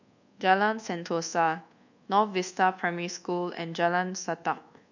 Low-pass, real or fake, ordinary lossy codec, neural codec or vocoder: 7.2 kHz; fake; none; codec, 24 kHz, 1.2 kbps, DualCodec